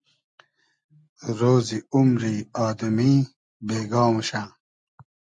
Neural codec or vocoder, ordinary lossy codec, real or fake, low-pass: none; MP3, 64 kbps; real; 9.9 kHz